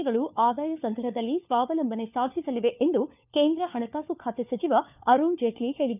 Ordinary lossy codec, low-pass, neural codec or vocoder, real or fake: none; 3.6 kHz; codec, 16 kHz, 4 kbps, FunCodec, trained on LibriTTS, 50 frames a second; fake